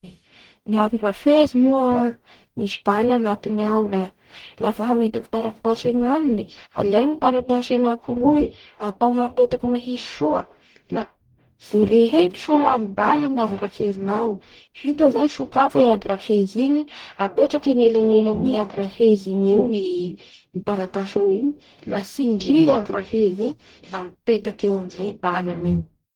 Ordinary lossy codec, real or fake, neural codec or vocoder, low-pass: Opus, 24 kbps; fake; codec, 44.1 kHz, 0.9 kbps, DAC; 19.8 kHz